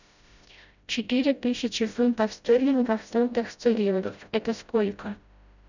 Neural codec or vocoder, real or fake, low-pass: codec, 16 kHz, 0.5 kbps, FreqCodec, smaller model; fake; 7.2 kHz